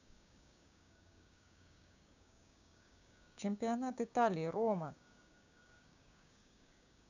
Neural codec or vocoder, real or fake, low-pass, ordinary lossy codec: codec, 16 kHz, 6 kbps, DAC; fake; 7.2 kHz; none